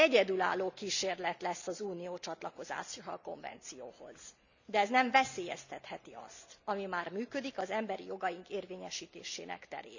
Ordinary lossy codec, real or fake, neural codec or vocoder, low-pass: none; real; none; 7.2 kHz